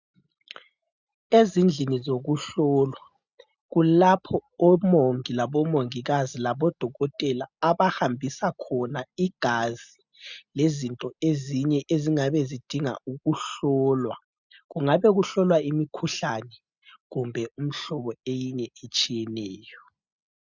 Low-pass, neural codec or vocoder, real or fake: 7.2 kHz; none; real